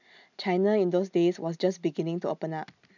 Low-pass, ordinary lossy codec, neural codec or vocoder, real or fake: 7.2 kHz; none; none; real